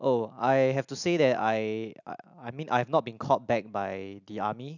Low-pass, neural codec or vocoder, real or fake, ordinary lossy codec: 7.2 kHz; none; real; none